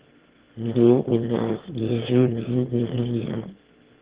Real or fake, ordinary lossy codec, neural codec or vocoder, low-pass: fake; Opus, 16 kbps; autoencoder, 22.05 kHz, a latent of 192 numbers a frame, VITS, trained on one speaker; 3.6 kHz